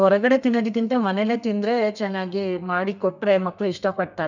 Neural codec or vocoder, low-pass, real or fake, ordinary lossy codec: codec, 32 kHz, 1.9 kbps, SNAC; 7.2 kHz; fake; none